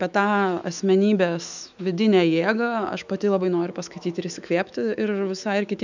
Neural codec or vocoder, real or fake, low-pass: autoencoder, 48 kHz, 128 numbers a frame, DAC-VAE, trained on Japanese speech; fake; 7.2 kHz